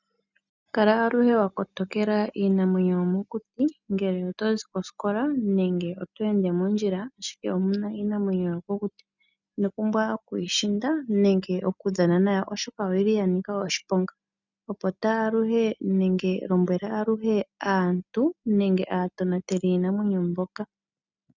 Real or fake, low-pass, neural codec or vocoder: real; 7.2 kHz; none